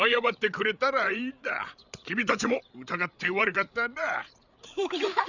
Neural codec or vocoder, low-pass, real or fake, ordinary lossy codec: codec, 16 kHz, 8 kbps, FreqCodec, larger model; 7.2 kHz; fake; none